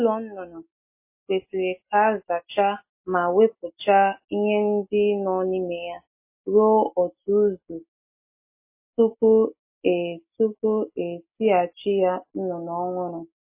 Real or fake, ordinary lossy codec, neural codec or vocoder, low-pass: real; MP3, 24 kbps; none; 3.6 kHz